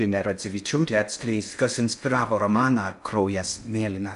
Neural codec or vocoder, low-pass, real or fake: codec, 16 kHz in and 24 kHz out, 0.6 kbps, FocalCodec, streaming, 2048 codes; 10.8 kHz; fake